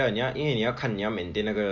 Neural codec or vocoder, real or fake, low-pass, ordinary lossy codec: none; real; 7.2 kHz; MP3, 48 kbps